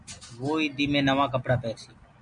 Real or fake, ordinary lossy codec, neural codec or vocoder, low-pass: real; MP3, 96 kbps; none; 9.9 kHz